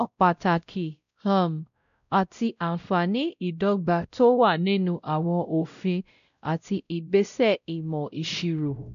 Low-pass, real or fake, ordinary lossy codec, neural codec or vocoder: 7.2 kHz; fake; none; codec, 16 kHz, 0.5 kbps, X-Codec, WavLM features, trained on Multilingual LibriSpeech